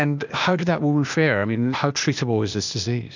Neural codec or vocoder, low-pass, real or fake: codec, 16 kHz, 0.8 kbps, ZipCodec; 7.2 kHz; fake